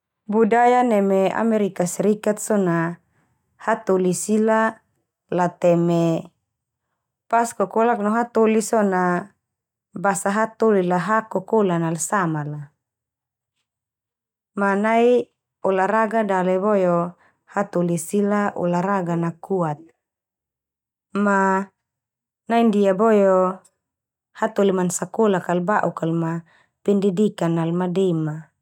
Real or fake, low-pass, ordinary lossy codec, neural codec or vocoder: real; 19.8 kHz; none; none